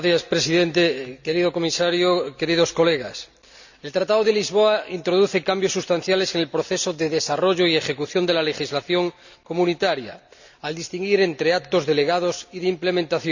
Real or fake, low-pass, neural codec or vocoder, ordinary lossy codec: real; 7.2 kHz; none; none